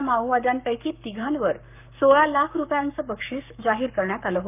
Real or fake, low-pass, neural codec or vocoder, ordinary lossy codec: fake; 3.6 kHz; codec, 44.1 kHz, 7.8 kbps, Pupu-Codec; none